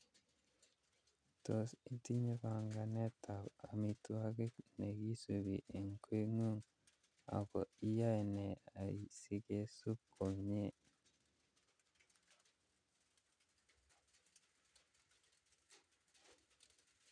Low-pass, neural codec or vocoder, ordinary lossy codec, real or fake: 9.9 kHz; none; none; real